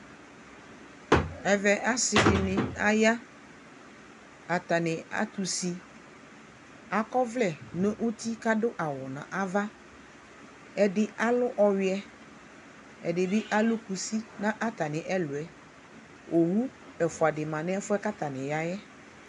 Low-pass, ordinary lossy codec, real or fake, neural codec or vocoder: 10.8 kHz; MP3, 96 kbps; real; none